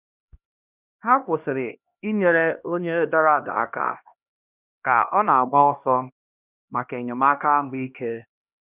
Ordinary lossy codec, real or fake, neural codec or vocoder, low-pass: none; fake; codec, 16 kHz, 1 kbps, X-Codec, HuBERT features, trained on LibriSpeech; 3.6 kHz